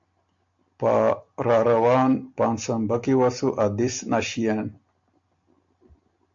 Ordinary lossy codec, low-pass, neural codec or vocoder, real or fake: AAC, 64 kbps; 7.2 kHz; none; real